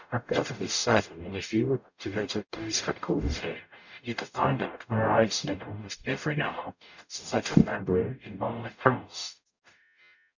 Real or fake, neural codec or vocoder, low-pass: fake; codec, 44.1 kHz, 0.9 kbps, DAC; 7.2 kHz